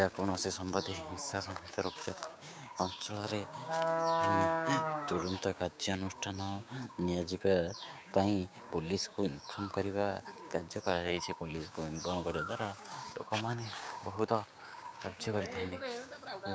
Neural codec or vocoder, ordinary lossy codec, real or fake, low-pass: codec, 16 kHz, 6 kbps, DAC; none; fake; none